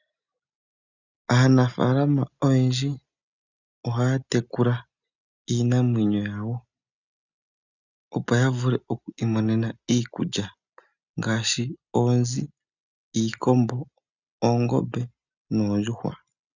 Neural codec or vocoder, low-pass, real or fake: none; 7.2 kHz; real